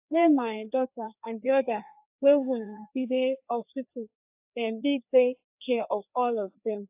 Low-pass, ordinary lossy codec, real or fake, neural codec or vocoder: 3.6 kHz; AAC, 32 kbps; fake; codec, 16 kHz, 2 kbps, FreqCodec, larger model